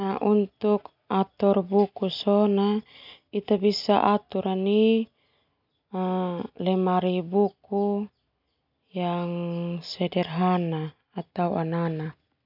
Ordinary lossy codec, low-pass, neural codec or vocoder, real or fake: none; 5.4 kHz; none; real